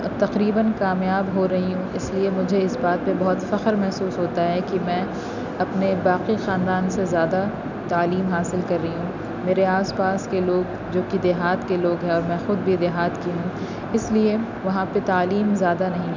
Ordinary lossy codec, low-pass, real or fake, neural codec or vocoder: none; 7.2 kHz; real; none